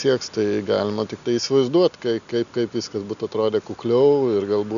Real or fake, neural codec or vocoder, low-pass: real; none; 7.2 kHz